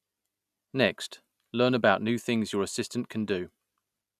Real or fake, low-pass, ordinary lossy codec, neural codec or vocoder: real; 14.4 kHz; none; none